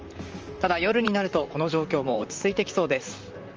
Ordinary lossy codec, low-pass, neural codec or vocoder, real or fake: Opus, 24 kbps; 7.2 kHz; vocoder, 44.1 kHz, 128 mel bands, Pupu-Vocoder; fake